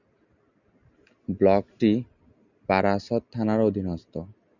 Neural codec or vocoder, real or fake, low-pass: none; real; 7.2 kHz